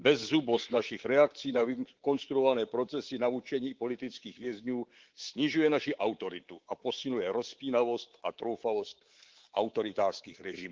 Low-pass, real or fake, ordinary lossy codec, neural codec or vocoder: 7.2 kHz; fake; Opus, 16 kbps; codec, 24 kHz, 3.1 kbps, DualCodec